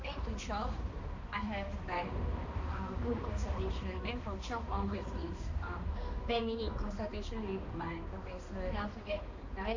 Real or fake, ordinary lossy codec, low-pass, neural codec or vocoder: fake; AAC, 48 kbps; 7.2 kHz; codec, 16 kHz, 2 kbps, X-Codec, HuBERT features, trained on balanced general audio